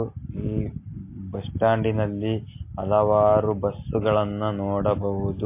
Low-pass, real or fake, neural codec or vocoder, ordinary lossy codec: 3.6 kHz; real; none; MP3, 24 kbps